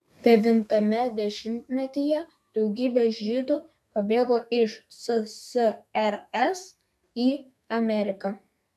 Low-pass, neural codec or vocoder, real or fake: 14.4 kHz; codec, 32 kHz, 1.9 kbps, SNAC; fake